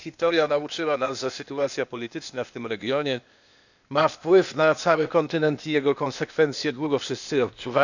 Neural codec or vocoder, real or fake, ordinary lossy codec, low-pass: codec, 16 kHz, 0.8 kbps, ZipCodec; fake; none; 7.2 kHz